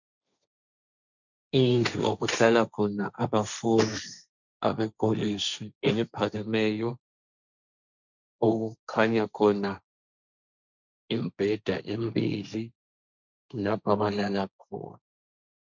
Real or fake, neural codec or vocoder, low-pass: fake; codec, 16 kHz, 1.1 kbps, Voila-Tokenizer; 7.2 kHz